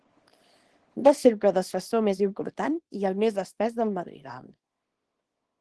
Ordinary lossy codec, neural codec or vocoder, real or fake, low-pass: Opus, 16 kbps; codec, 24 kHz, 0.9 kbps, WavTokenizer, small release; fake; 10.8 kHz